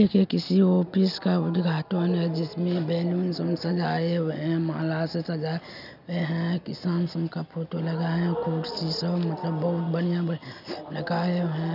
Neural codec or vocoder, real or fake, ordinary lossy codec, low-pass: none; real; none; 5.4 kHz